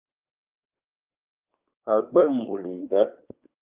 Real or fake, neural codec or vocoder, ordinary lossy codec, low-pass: fake; codec, 24 kHz, 1 kbps, SNAC; Opus, 24 kbps; 3.6 kHz